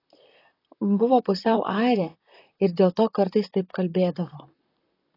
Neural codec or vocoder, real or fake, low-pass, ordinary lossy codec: vocoder, 44.1 kHz, 128 mel bands, Pupu-Vocoder; fake; 5.4 kHz; AAC, 24 kbps